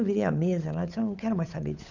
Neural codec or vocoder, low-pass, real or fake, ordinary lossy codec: codec, 16 kHz, 8 kbps, FunCodec, trained on Chinese and English, 25 frames a second; 7.2 kHz; fake; none